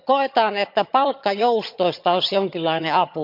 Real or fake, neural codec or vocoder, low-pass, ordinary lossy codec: fake; vocoder, 22.05 kHz, 80 mel bands, HiFi-GAN; 5.4 kHz; MP3, 48 kbps